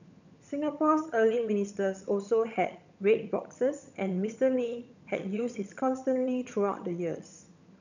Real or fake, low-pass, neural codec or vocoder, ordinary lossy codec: fake; 7.2 kHz; vocoder, 22.05 kHz, 80 mel bands, HiFi-GAN; none